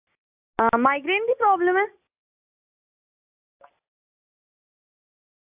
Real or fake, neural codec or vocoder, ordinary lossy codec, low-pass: real; none; AAC, 32 kbps; 3.6 kHz